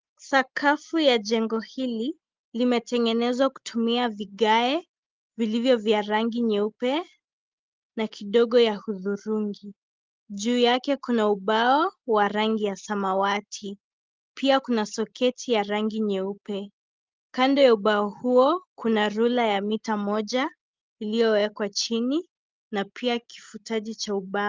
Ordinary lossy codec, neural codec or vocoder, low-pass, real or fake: Opus, 32 kbps; none; 7.2 kHz; real